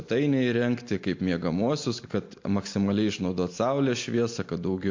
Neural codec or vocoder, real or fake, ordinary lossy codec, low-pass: none; real; MP3, 48 kbps; 7.2 kHz